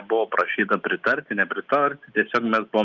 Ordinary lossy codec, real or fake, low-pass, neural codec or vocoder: Opus, 24 kbps; real; 7.2 kHz; none